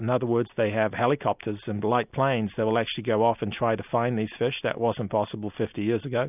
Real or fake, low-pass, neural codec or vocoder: real; 3.6 kHz; none